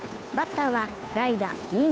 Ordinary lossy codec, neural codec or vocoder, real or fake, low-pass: none; codec, 16 kHz, 2 kbps, FunCodec, trained on Chinese and English, 25 frames a second; fake; none